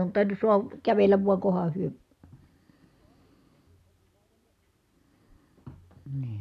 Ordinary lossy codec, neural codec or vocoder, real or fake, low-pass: none; none; real; 14.4 kHz